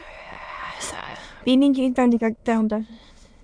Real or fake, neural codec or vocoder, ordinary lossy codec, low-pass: fake; autoencoder, 22.05 kHz, a latent of 192 numbers a frame, VITS, trained on many speakers; MP3, 64 kbps; 9.9 kHz